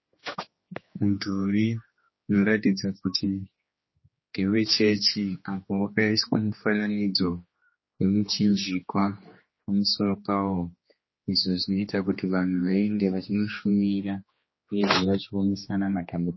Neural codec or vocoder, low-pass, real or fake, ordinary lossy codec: codec, 16 kHz, 2 kbps, X-Codec, HuBERT features, trained on general audio; 7.2 kHz; fake; MP3, 24 kbps